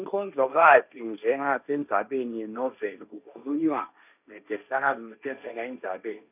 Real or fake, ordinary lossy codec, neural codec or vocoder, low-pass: fake; none; codec, 16 kHz, 1.1 kbps, Voila-Tokenizer; 3.6 kHz